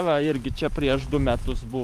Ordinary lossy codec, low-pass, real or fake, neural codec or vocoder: Opus, 32 kbps; 14.4 kHz; fake; vocoder, 44.1 kHz, 128 mel bands every 512 samples, BigVGAN v2